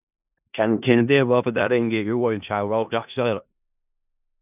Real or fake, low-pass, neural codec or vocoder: fake; 3.6 kHz; codec, 16 kHz in and 24 kHz out, 0.4 kbps, LongCat-Audio-Codec, four codebook decoder